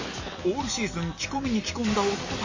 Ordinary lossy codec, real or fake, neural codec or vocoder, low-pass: MP3, 32 kbps; real; none; 7.2 kHz